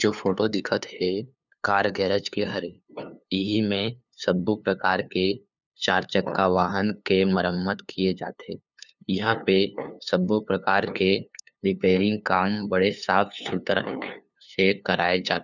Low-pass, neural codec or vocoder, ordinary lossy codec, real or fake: 7.2 kHz; codec, 16 kHz, 2 kbps, FunCodec, trained on LibriTTS, 25 frames a second; none; fake